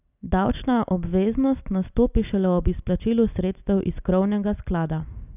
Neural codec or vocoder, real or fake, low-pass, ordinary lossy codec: none; real; 3.6 kHz; none